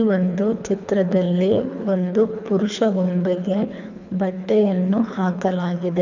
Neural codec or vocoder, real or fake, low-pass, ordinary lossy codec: codec, 24 kHz, 3 kbps, HILCodec; fake; 7.2 kHz; none